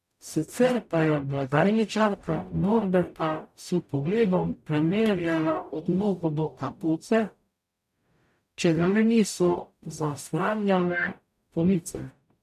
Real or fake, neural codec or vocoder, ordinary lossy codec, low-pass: fake; codec, 44.1 kHz, 0.9 kbps, DAC; none; 14.4 kHz